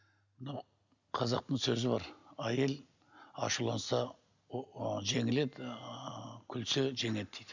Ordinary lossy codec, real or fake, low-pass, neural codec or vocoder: none; real; 7.2 kHz; none